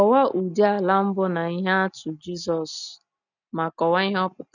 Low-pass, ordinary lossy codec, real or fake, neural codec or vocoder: 7.2 kHz; none; real; none